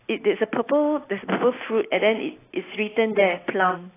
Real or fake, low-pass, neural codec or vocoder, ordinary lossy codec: real; 3.6 kHz; none; AAC, 16 kbps